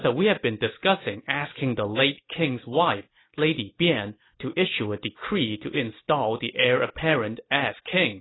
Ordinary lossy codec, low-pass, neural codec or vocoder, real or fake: AAC, 16 kbps; 7.2 kHz; none; real